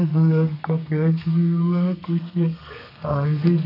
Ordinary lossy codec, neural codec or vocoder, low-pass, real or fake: none; codec, 32 kHz, 1.9 kbps, SNAC; 5.4 kHz; fake